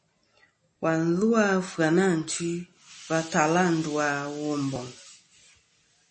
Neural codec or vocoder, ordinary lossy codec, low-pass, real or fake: none; MP3, 32 kbps; 10.8 kHz; real